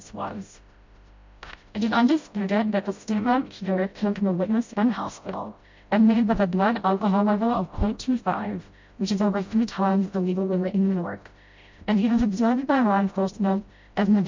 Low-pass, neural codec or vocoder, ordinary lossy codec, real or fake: 7.2 kHz; codec, 16 kHz, 0.5 kbps, FreqCodec, smaller model; MP3, 48 kbps; fake